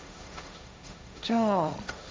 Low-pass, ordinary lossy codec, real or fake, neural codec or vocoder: none; none; fake; codec, 16 kHz, 1.1 kbps, Voila-Tokenizer